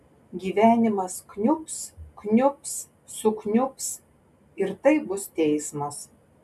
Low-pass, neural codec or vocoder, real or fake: 14.4 kHz; none; real